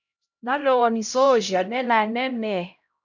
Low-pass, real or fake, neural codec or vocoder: 7.2 kHz; fake; codec, 16 kHz, 0.5 kbps, X-Codec, HuBERT features, trained on LibriSpeech